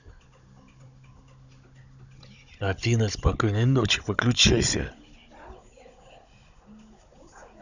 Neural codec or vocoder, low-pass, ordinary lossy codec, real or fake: codec, 16 kHz, 16 kbps, FunCodec, trained on Chinese and English, 50 frames a second; 7.2 kHz; none; fake